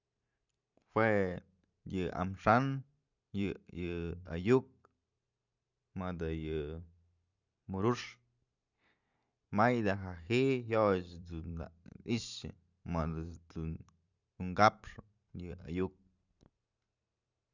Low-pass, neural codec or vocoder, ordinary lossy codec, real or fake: 7.2 kHz; vocoder, 44.1 kHz, 128 mel bands every 512 samples, BigVGAN v2; none; fake